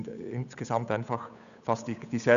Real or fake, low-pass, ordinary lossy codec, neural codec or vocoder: fake; 7.2 kHz; none; codec, 16 kHz, 8 kbps, FunCodec, trained on Chinese and English, 25 frames a second